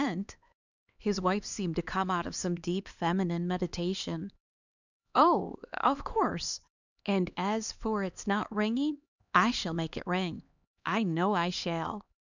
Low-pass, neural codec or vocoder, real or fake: 7.2 kHz; codec, 16 kHz, 2 kbps, X-Codec, HuBERT features, trained on LibriSpeech; fake